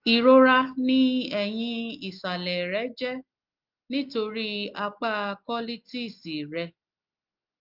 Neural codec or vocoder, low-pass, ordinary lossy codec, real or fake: none; 5.4 kHz; Opus, 32 kbps; real